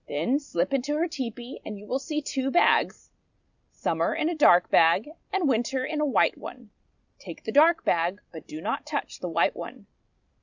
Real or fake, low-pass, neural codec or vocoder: real; 7.2 kHz; none